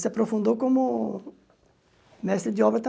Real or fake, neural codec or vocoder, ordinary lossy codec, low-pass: real; none; none; none